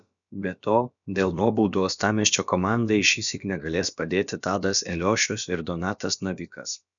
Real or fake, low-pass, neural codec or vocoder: fake; 7.2 kHz; codec, 16 kHz, about 1 kbps, DyCAST, with the encoder's durations